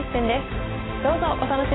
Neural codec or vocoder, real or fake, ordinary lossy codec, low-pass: none; real; AAC, 16 kbps; 7.2 kHz